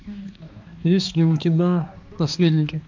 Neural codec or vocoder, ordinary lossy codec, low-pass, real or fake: codec, 16 kHz, 2 kbps, X-Codec, HuBERT features, trained on balanced general audio; MP3, 64 kbps; 7.2 kHz; fake